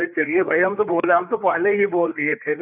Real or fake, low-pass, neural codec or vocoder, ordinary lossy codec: fake; 3.6 kHz; codec, 16 kHz, 4 kbps, FreqCodec, larger model; none